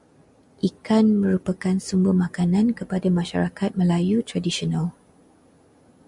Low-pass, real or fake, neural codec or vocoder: 10.8 kHz; real; none